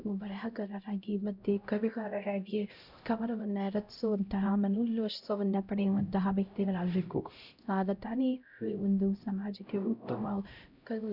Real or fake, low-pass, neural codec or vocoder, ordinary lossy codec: fake; 5.4 kHz; codec, 16 kHz, 0.5 kbps, X-Codec, HuBERT features, trained on LibriSpeech; none